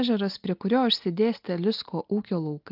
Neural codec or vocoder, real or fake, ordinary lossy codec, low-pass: none; real; Opus, 32 kbps; 5.4 kHz